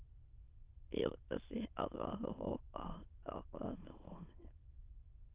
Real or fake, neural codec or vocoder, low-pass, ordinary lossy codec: fake; autoencoder, 22.05 kHz, a latent of 192 numbers a frame, VITS, trained on many speakers; 3.6 kHz; Opus, 24 kbps